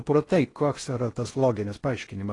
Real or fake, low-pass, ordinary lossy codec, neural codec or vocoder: fake; 10.8 kHz; AAC, 32 kbps; codec, 16 kHz in and 24 kHz out, 0.6 kbps, FocalCodec, streaming, 4096 codes